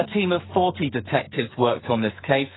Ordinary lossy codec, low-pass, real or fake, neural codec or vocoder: AAC, 16 kbps; 7.2 kHz; fake; codec, 44.1 kHz, 2.6 kbps, SNAC